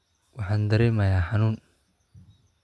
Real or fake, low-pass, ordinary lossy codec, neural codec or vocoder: real; none; none; none